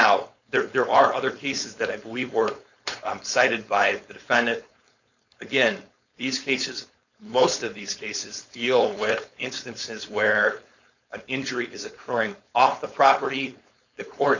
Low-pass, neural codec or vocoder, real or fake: 7.2 kHz; codec, 16 kHz, 4.8 kbps, FACodec; fake